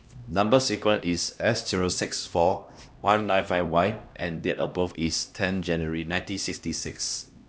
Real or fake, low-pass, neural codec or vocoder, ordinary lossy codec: fake; none; codec, 16 kHz, 1 kbps, X-Codec, HuBERT features, trained on LibriSpeech; none